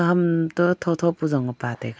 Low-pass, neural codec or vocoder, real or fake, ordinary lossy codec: none; none; real; none